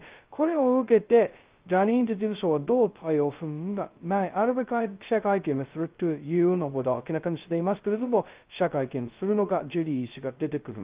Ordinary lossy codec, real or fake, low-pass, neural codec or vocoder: Opus, 32 kbps; fake; 3.6 kHz; codec, 16 kHz, 0.2 kbps, FocalCodec